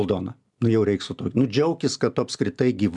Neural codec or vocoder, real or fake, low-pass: none; real; 10.8 kHz